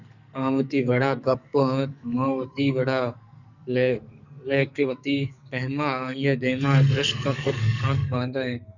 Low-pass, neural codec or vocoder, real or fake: 7.2 kHz; codec, 44.1 kHz, 2.6 kbps, SNAC; fake